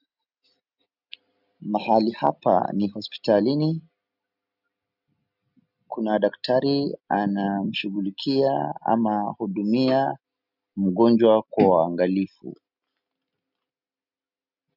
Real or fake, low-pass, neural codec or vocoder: real; 5.4 kHz; none